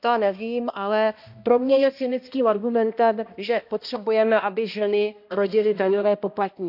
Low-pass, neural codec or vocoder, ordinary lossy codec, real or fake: 5.4 kHz; codec, 16 kHz, 1 kbps, X-Codec, HuBERT features, trained on balanced general audio; none; fake